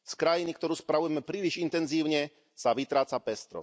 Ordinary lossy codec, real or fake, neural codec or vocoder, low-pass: none; real; none; none